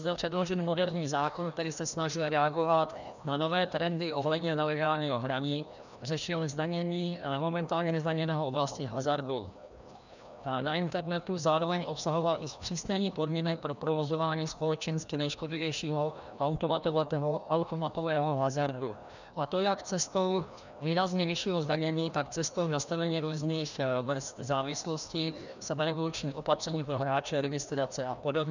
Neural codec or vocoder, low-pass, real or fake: codec, 16 kHz, 1 kbps, FreqCodec, larger model; 7.2 kHz; fake